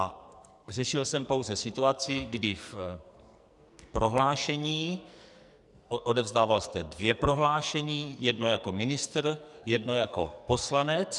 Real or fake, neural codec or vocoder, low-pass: fake; codec, 44.1 kHz, 2.6 kbps, SNAC; 10.8 kHz